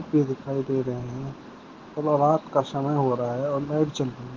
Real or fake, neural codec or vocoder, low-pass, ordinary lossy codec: real; none; 7.2 kHz; Opus, 16 kbps